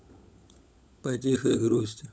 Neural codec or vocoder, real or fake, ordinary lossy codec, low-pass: codec, 16 kHz, 16 kbps, FunCodec, trained on LibriTTS, 50 frames a second; fake; none; none